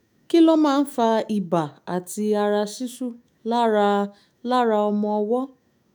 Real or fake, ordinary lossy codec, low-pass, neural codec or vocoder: fake; none; none; autoencoder, 48 kHz, 128 numbers a frame, DAC-VAE, trained on Japanese speech